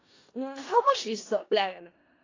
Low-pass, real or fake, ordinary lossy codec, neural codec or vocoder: 7.2 kHz; fake; AAC, 32 kbps; codec, 16 kHz in and 24 kHz out, 0.4 kbps, LongCat-Audio-Codec, four codebook decoder